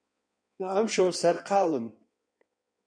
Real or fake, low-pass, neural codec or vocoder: fake; 9.9 kHz; codec, 16 kHz in and 24 kHz out, 1.1 kbps, FireRedTTS-2 codec